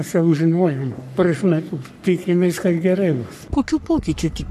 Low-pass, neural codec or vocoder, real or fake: 14.4 kHz; codec, 44.1 kHz, 3.4 kbps, Pupu-Codec; fake